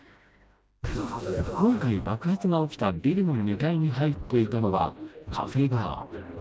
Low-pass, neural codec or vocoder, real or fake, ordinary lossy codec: none; codec, 16 kHz, 1 kbps, FreqCodec, smaller model; fake; none